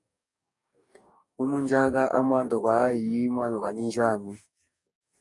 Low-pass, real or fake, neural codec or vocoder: 10.8 kHz; fake; codec, 44.1 kHz, 2.6 kbps, DAC